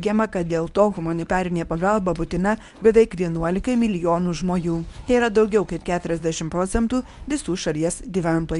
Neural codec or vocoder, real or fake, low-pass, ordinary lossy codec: codec, 24 kHz, 0.9 kbps, WavTokenizer, medium speech release version 1; fake; 10.8 kHz; MP3, 96 kbps